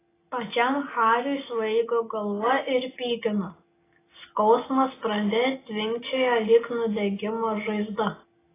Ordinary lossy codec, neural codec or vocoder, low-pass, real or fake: AAC, 16 kbps; none; 3.6 kHz; real